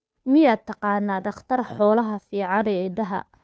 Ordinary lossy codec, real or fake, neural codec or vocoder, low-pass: none; fake; codec, 16 kHz, 2 kbps, FunCodec, trained on Chinese and English, 25 frames a second; none